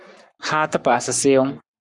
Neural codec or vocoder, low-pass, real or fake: codec, 44.1 kHz, 7.8 kbps, Pupu-Codec; 10.8 kHz; fake